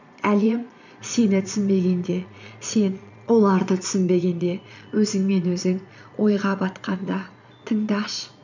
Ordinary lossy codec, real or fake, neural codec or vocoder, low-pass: none; fake; vocoder, 22.05 kHz, 80 mel bands, Vocos; 7.2 kHz